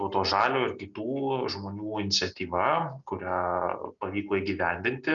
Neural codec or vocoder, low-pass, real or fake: none; 7.2 kHz; real